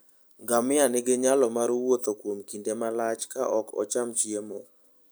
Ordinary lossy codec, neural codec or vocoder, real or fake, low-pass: none; none; real; none